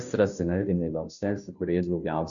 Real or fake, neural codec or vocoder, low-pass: fake; codec, 16 kHz, 0.5 kbps, FunCodec, trained on Chinese and English, 25 frames a second; 7.2 kHz